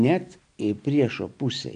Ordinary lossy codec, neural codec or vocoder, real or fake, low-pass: AAC, 64 kbps; none; real; 9.9 kHz